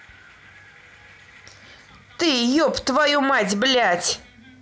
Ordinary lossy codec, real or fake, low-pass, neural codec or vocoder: none; real; none; none